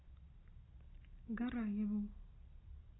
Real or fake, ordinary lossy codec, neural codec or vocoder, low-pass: real; AAC, 16 kbps; none; 7.2 kHz